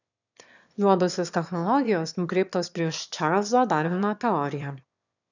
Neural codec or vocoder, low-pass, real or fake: autoencoder, 22.05 kHz, a latent of 192 numbers a frame, VITS, trained on one speaker; 7.2 kHz; fake